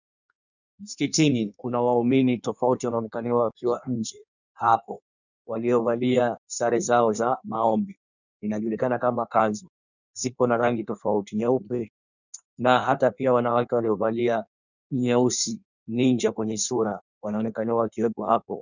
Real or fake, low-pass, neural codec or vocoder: fake; 7.2 kHz; codec, 16 kHz in and 24 kHz out, 1.1 kbps, FireRedTTS-2 codec